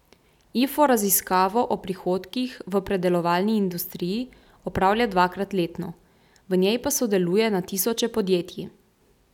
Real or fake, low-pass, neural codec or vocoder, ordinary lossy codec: real; 19.8 kHz; none; none